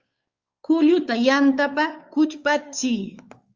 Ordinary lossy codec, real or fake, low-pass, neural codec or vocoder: Opus, 32 kbps; fake; 7.2 kHz; codec, 16 kHz, 4 kbps, X-Codec, WavLM features, trained on Multilingual LibriSpeech